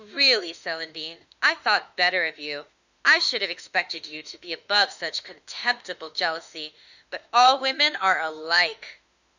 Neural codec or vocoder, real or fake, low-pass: autoencoder, 48 kHz, 32 numbers a frame, DAC-VAE, trained on Japanese speech; fake; 7.2 kHz